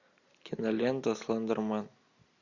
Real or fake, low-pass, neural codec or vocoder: real; 7.2 kHz; none